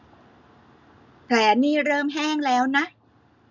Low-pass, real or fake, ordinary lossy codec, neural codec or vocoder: 7.2 kHz; real; none; none